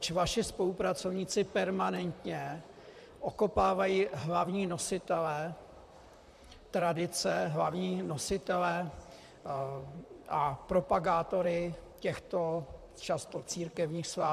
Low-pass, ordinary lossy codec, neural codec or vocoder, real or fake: 14.4 kHz; MP3, 96 kbps; vocoder, 44.1 kHz, 128 mel bands, Pupu-Vocoder; fake